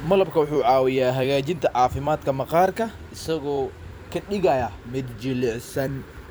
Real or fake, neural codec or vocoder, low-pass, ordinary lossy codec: fake; vocoder, 44.1 kHz, 128 mel bands every 256 samples, BigVGAN v2; none; none